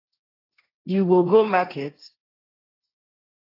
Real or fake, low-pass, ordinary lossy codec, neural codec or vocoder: fake; 5.4 kHz; AAC, 24 kbps; codec, 16 kHz, 1.1 kbps, Voila-Tokenizer